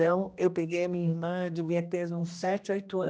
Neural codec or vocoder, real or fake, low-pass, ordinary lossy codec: codec, 16 kHz, 1 kbps, X-Codec, HuBERT features, trained on general audio; fake; none; none